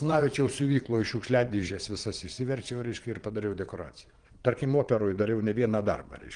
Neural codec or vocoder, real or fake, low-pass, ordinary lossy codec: vocoder, 22.05 kHz, 80 mel bands, Vocos; fake; 9.9 kHz; Opus, 24 kbps